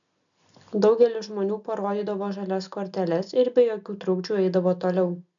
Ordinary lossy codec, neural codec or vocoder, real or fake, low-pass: MP3, 96 kbps; none; real; 7.2 kHz